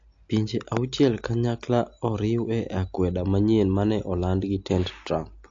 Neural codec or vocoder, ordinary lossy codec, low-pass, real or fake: none; AAC, 48 kbps; 7.2 kHz; real